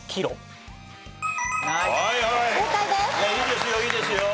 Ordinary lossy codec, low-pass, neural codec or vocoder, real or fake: none; none; none; real